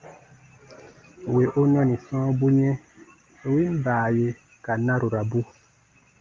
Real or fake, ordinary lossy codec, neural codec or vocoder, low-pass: real; Opus, 32 kbps; none; 7.2 kHz